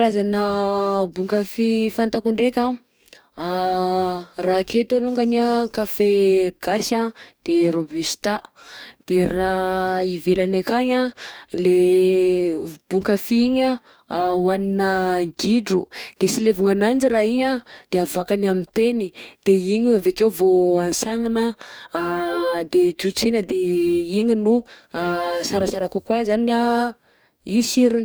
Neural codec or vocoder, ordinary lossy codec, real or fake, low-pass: codec, 44.1 kHz, 2.6 kbps, DAC; none; fake; none